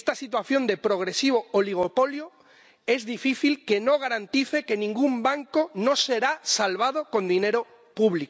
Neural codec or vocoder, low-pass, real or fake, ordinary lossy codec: none; none; real; none